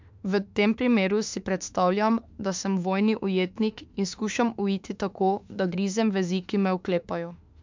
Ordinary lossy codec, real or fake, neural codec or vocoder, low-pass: MP3, 64 kbps; fake; autoencoder, 48 kHz, 32 numbers a frame, DAC-VAE, trained on Japanese speech; 7.2 kHz